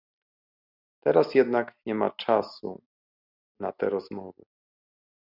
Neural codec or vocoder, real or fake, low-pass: none; real; 5.4 kHz